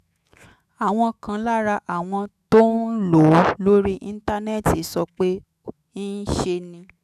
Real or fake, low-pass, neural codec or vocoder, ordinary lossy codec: fake; 14.4 kHz; autoencoder, 48 kHz, 128 numbers a frame, DAC-VAE, trained on Japanese speech; none